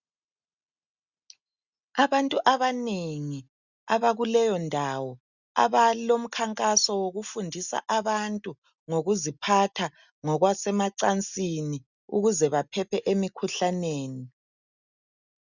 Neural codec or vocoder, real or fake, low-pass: none; real; 7.2 kHz